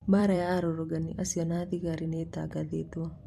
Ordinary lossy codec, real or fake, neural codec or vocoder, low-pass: MP3, 64 kbps; fake; vocoder, 44.1 kHz, 128 mel bands every 256 samples, BigVGAN v2; 14.4 kHz